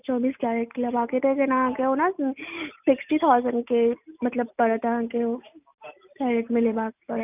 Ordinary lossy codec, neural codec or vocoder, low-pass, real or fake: none; none; 3.6 kHz; real